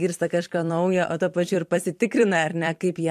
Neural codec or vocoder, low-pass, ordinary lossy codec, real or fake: vocoder, 44.1 kHz, 128 mel bands every 256 samples, BigVGAN v2; 14.4 kHz; MP3, 64 kbps; fake